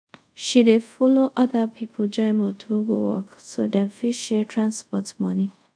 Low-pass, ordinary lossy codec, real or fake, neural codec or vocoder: 9.9 kHz; none; fake; codec, 24 kHz, 0.5 kbps, DualCodec